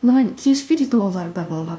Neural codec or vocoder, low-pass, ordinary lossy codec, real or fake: codec, 16 kHz, 0.5 kbps, FunCodec, trained on LibriTTS, 25 frames a second; none; none; fake